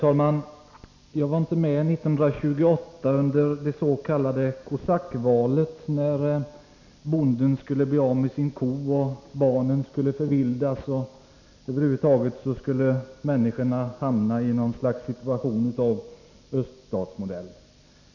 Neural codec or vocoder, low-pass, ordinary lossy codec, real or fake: none; 7.2 kHz; none; real